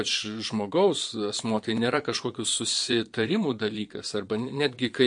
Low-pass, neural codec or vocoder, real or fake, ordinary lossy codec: 9.9 kHz; vocoder, 22.05 kHz, 80 mel bands, Vocos; fake; MP3, 48 kbps